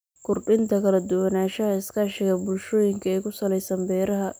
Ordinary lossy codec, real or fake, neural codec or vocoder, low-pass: none; real; none; none